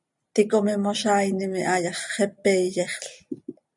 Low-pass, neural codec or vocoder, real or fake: 10.8 kHz; none; real